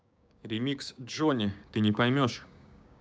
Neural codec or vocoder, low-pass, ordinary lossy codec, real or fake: codec, 16 kHz, 6 kbps, DAC; none; none; fake